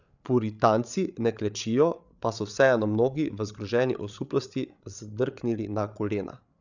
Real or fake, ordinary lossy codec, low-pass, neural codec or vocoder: fake; none; 7.2 kHz; codec, 16 kHz, 8 kbps, FreqCodec, larger model